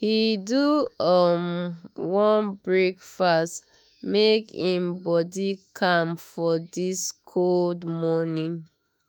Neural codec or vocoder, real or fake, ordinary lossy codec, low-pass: autoencoder, 48 kHz, 32 numbers a frame, DAC-VAE, trained on Japanese speech; fake; none; 19.8 kHz